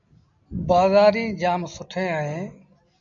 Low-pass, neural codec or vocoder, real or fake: 7.2 kHz; none; real